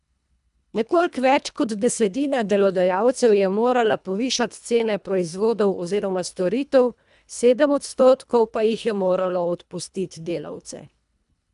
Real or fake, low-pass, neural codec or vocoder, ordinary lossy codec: fake; 10.8 kHz; codec, 24 kHz, 1.5 kbps, HILCodec; none